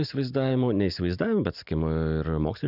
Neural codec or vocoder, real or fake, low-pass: none; real; 5.4 kHz